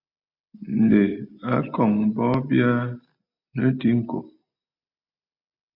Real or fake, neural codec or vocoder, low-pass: real; none; 5.4 kHz